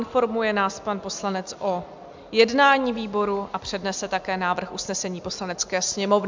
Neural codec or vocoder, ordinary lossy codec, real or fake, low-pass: none; MP3, 64 kbps; real; 7.2 kHz